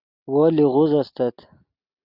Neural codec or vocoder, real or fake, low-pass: none; real; 5.4 kHz